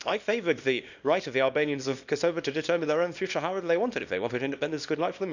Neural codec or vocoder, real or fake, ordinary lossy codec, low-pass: codec, 24 kHz, 0.9 kbps, WavTokenizer, small release; fake; none; 7.2 kHz